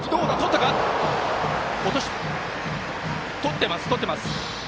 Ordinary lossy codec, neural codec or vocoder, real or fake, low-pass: none; none; real; none